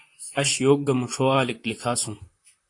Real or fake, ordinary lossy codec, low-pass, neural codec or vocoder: fake; AAC, 48 kbps; 10.8 kHz; vocoder, 44.1 kHz, 128 mel bands, Pupu-Vocoder